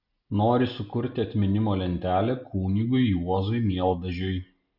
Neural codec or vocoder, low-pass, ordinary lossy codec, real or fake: none; 5.4 kHz; Opus, 64 kbps; real